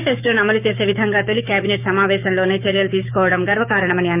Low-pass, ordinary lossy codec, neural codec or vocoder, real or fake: 3.6 kHz; none; codec, 16 kHz, 6 kbps, DAC; fake